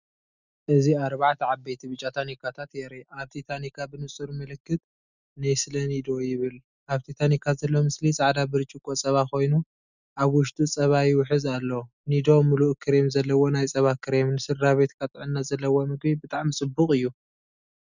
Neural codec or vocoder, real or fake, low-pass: none; real; 7.2 kHz